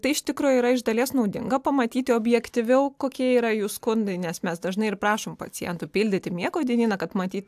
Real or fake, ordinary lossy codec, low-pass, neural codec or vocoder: real; AAC, 96 kbps; 14.4 kHz; none